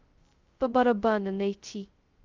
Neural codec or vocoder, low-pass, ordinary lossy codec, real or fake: codec, 16 kHz, 0.2 kbps, FocalCodec; 7.2 kHz; Opus, 32 kbps; fake